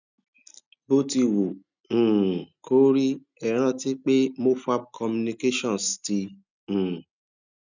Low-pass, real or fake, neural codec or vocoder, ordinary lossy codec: 7.2 kHz; real; none; none